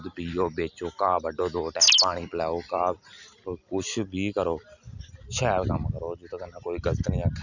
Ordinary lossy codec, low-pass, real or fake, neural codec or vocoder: none; 7.2 kHz; real; none